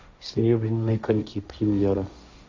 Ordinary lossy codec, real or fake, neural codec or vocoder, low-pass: MP3, 64 kbps; fake; codec, 16 kHz, 1.1 kbps, Voila-Tokenizer; 7.2 kHz